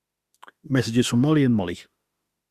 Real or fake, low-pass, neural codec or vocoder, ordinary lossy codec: fake; 14.4 kHz; autoencoder, 48 kHz, 32 numbers a frame, DAC-VAE, trained on Japanese speech; Opus, 64 kbps